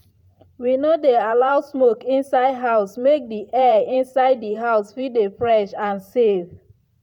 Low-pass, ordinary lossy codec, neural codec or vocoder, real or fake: 19.8 kHz; none; vocoder, 44.1 kHz, 128 mel bands every 512 samples, BigVGAN v2; fake